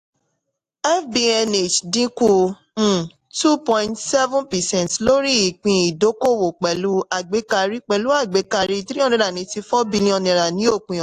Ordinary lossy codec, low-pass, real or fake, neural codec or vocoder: AAC, 64 kbps; 14.4 kHz; real; none